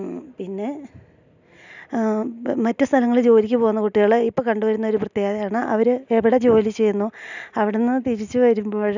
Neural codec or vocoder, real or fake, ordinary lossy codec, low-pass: none; real; none; 7.2 kHz